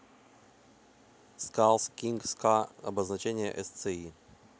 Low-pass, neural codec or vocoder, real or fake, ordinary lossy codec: none; none; real; none